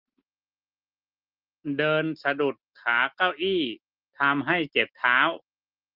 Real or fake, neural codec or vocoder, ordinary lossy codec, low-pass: real; none; Opus, 32 kbps; 5.4 kHz